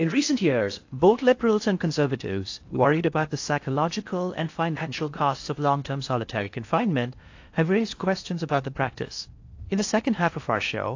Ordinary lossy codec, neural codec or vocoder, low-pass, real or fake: AAC, 48 kbps; codec, 16 kHz in and 24 kHz out, 0.6 kbps, FocalCodec, streaming, 4096 codes; 7.2 kHz; fake